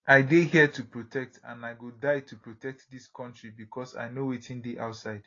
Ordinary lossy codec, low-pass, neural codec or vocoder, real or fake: AAC, 32 kbps; 7.2 kHz; none; real